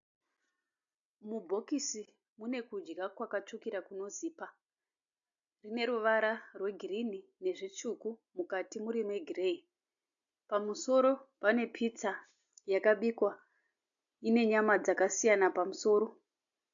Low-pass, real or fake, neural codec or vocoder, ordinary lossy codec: 7.2 kHz; real; none; AAC, 64 kbps